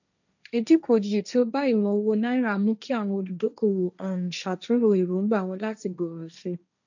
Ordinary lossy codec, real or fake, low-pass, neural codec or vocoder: none; fake; 7.2 kHz; codec, 16 kHz, 1.1 kbps, Voila-Tokenizer